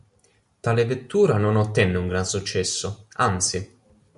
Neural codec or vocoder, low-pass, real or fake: none; 10.8 kHz; real